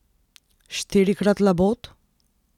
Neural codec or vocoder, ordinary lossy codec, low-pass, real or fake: none; none; 19.8 kHz; real